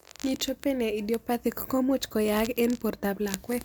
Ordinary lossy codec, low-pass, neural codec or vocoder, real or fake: none; none; none; real